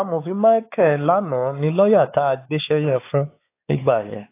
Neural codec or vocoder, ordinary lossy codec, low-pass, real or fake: codec, 16 kHz, 4 kbps, X-Codec, WavLM features, trained on Multilingual LibriSpeech; AAC, 24 kbps; 3.6 kHz; fake